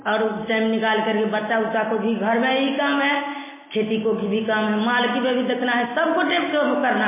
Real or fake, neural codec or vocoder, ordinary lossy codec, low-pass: real; none; MP3, 16 kbps; 3.6 kHz